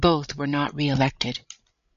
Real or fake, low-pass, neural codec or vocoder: fake; 7.2 kHz; codec, 16 kHz, 16 kbps, FreqCodec, larger model